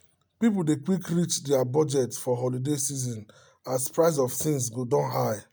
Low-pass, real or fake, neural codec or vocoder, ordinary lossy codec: none; real; none; none